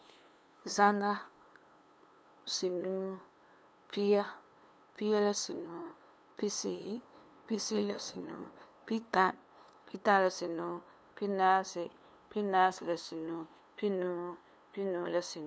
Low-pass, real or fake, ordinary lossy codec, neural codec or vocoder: none; fake; none; codec, 16 kHz, 2 kbps, FunCodec, trained on LibriTTS, 25 frames a second